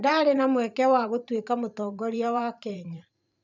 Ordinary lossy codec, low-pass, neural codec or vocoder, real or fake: none; 7.2 kHz; vocoder, 44.1 kHz, 128 mel bands every 512 samples, BigVGAN v2; fake